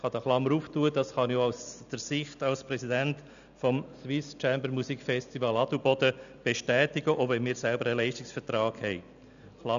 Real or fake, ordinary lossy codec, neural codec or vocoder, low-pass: real; none; none; 7.2 kHz